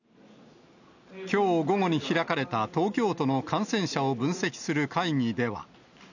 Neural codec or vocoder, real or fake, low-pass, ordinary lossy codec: none; real; 7.2 kHz; none